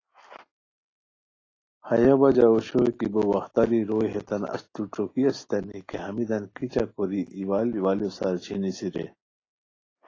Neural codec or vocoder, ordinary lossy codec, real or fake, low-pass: none; AAC, 32 kbps; real; 7.2 kHz